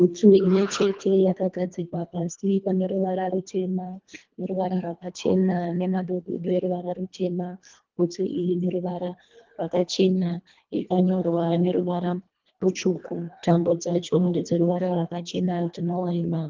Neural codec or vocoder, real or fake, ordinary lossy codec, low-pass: codec, 24 kHz, 1.5 kbps, HILCodec; fake; Opus, 32 kbps; 7.2 kHz